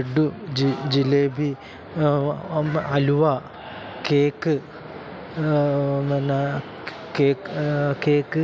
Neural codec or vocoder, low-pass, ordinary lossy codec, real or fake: none; none; none; real